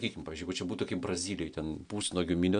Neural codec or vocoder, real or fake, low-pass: none; real; 9.9 kHz